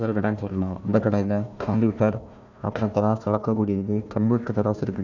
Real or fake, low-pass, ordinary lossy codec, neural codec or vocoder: fake; 7.2 kHz; none; codec, 16 kHz, 1 kbps, FunCodec, trained on Chinese and English, 50 frames a second